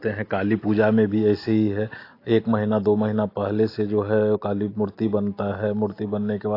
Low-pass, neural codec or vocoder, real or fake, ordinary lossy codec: 5.4 kHz; none; real; AAC, 32 kbps